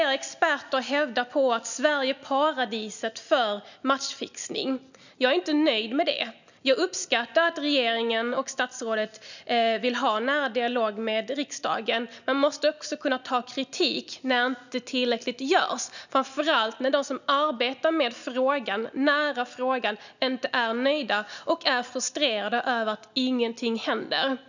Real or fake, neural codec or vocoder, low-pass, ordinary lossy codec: real; none; 7.2 kHz; none